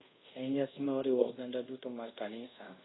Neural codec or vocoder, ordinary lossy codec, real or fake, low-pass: codec, 24 kHz, 0.5 kbps, DualCodec; AAC, 16 kbps; fake; 7.2 kHz